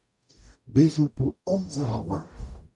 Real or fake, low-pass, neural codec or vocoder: fake; 10.8 kHz; codec, 44.1 kHz, 0.9 kbps, DAC